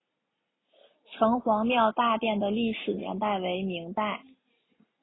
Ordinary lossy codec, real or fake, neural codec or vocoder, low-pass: AAC, 16 kbps; real; none; 7.2 kHz